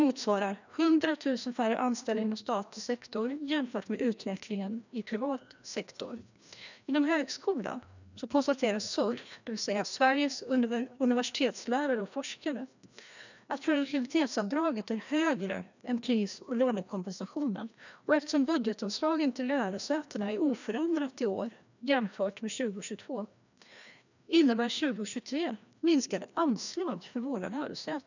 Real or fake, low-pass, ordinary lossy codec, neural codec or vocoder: fake; 7.2 kHz; none; codec, 16 kHz, 1 kbps, FreqCodec, larger model